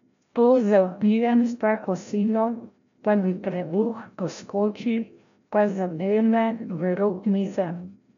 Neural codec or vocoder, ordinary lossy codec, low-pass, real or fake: codec, 16 kHz, 0.5 kbps, FreqCodec, larger model; none; 7.2 kHz; fake